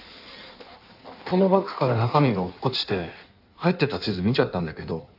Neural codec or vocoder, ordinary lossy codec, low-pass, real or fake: codec, 16 kHz in and 24 kHz out, 1.1 kbps, FireRedTTS-2 codec; none; 5.4 kHz; fake